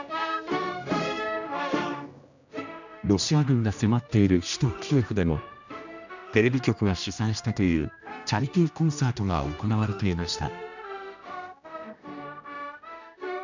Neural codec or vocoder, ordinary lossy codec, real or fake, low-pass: codec, 16 kHz, 1 kbps, X-Codec, HuBERT features, trained on general audio; none; fake; 7.2 kHz